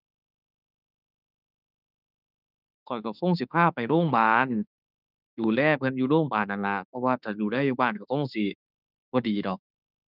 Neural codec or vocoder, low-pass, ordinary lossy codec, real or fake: autoencoder, 48 kHz, 32 numbers a frame, DAC-VAE, trained on Japanese speech; 5.4 kHz; none; fake